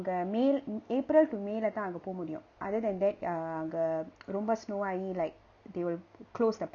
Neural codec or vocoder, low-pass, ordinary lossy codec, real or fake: none; 7.2 kHz; none; real